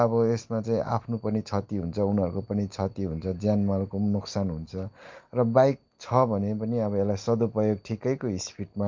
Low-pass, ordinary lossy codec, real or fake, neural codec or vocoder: 7.2 kHz; Opus, 24 kbps; real; none